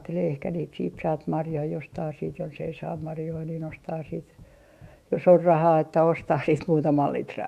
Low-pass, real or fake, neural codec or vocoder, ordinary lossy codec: 14.4 kHz; fake; autoencoder, 48 kHz, 128 numbers a frame, DAC-VAE, trained on Japanese speech; Opus, 64 kbps